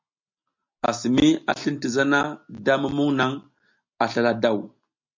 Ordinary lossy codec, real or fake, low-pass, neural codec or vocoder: MP3, 64 kbps; real; 7.2 kHz; none